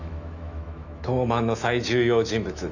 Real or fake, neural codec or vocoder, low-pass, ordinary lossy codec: fake; autoencoder, 48 kHz, 128 numbers a frame, DAC-VAE, trained on Japanese speech; 7.2 kHz; none